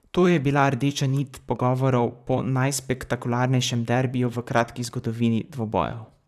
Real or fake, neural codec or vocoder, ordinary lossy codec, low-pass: fake; vocoder, 44.1 kHz, 128 mel bands, Pupu-Vocoder; none; 14.4 kHz